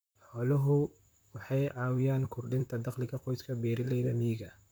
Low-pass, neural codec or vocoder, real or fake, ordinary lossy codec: none; vocoder, 44.1 kHz, 128 mel bands, Pupu-Vocoder; fake; none